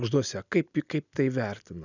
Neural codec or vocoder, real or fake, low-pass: none; real; 7.2 kHz